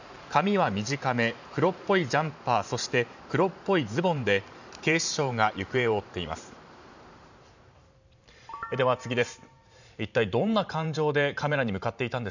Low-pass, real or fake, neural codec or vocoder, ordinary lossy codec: 7.2 kHz; fake; vocoder, 44.1 kHz, 128 mel bands every 512 samples, BigVGAN v2; none